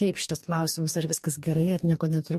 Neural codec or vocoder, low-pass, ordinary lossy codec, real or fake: codec, 44.1 kHz, 2.6 kbps, DAC; 14.4 kHz; MP3, 64 kbps; fake